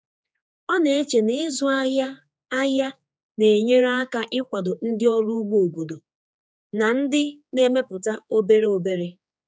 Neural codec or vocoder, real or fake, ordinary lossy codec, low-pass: codec, 16 kHz, 4 kbps, X-Codec, HuBERT features, trained on general audio; fake; none; none